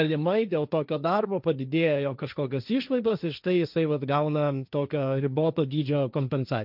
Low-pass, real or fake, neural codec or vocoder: 5.4 kHz; fake; codec, 16 kHz, 1.1 kbps, Voila-Tokenizer